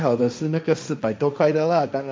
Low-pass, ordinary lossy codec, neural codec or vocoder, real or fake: none; none; codec, 16 kHz, 1.1 kbps, Voila-Tokenizer; fake